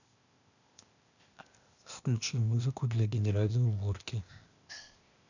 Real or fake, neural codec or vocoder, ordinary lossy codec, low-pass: fake; codec, 16 kHz, 0.8 kbps, ZipCodec; none; 7.2 kHz